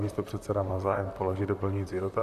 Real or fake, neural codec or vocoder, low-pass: fake; vocoder, 44.1 kHz, 128 mel bands, Pupu-Vocoder; 14.4 kHz